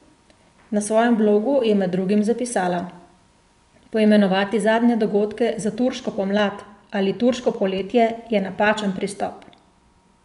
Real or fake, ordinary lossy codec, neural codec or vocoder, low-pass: fake; none; vocoder, 24 kHz, 100 mel bands, Vocos; 10.8 kHz